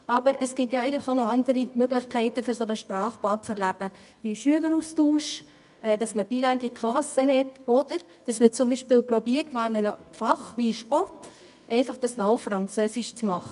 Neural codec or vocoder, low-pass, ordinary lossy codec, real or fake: codec, 24 kHz, 0.9 kbps, WavTokenizer, medium music audio release; 10.8 kHz; none; fake